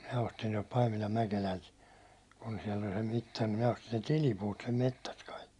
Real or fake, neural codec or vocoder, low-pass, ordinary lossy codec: real; none; 10.8 kHz; Opus, 64 kbps